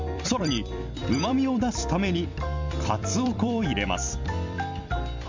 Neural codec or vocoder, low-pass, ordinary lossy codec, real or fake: vocoder, 44.1 kHz, 128 mel bands every 256 samples, BigVGAN v2; 7.2 kHz; MP3, 64 kbps; fake